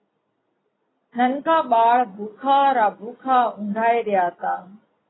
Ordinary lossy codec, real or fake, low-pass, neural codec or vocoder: AAC, 16 kbps; real; 7.2 kHz; none